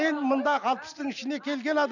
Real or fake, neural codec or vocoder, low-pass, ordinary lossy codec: real; none; 7.2 kHz; none